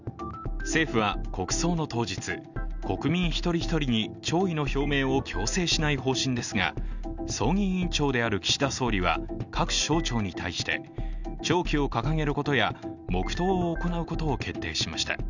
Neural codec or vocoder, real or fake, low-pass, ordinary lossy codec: none; real; 7.2 kHz; none